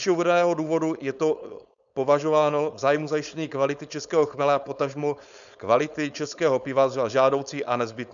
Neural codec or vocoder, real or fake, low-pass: codec, 16 kHz, 4.8 kbps, FACodec; fake; 7.2 kHz